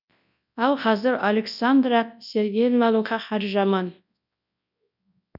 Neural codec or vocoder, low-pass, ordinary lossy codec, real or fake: codec, 24 kHz, 0.9 kbps, WavTokenizer, large speech release; 5.4 kHz; none; fake